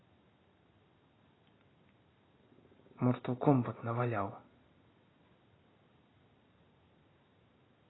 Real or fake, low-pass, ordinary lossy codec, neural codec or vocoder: real; 7.2 kHz; AAC, 16 kbps; none